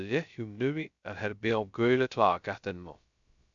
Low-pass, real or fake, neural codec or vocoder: 7.2 kHz; fake; codec, 16 kHz, 0.2 kbps, FocalCodec